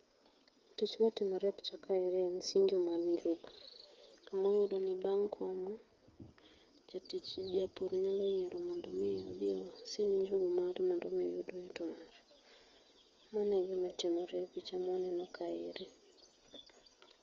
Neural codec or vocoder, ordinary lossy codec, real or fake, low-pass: codec, 16 kHz, 8 kbps, FreqCodec, smaller model; Opus, 32 kbps; fake; 7.2 kHz